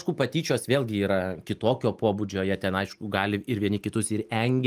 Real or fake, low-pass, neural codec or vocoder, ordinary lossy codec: real; 14.4 kHz; none; Opus, 32 kbps